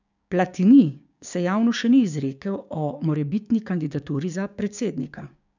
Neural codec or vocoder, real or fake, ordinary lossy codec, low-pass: codec, 16 kHz, 6 kbps, DAC; fake; none; 7.2 kHz